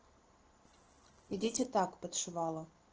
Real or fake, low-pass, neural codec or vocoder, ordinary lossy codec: real; 7.2 kHz; none; Opus, 16 kbps